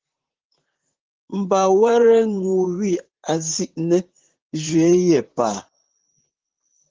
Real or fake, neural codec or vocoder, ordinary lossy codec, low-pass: fake; vocoder, 44.1 kHz, 128 mel bands, Pupu-Vocoder; Opus, 16 kbps; 7.2 kHz